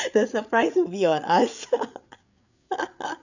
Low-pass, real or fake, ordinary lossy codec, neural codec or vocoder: 7.2 kHz; fake; none; codec, 16 kHz, 8 kbps, FreqCodec, larger model